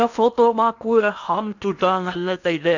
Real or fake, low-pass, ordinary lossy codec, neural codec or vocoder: fake; 7.2 kHz; none; codec, 16 kHz in and 24 kHz out, 0.8 kbps, FocalCodec, streaming, 65536 codes